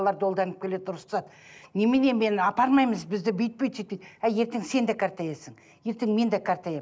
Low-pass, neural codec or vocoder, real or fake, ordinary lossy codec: none; none; real; none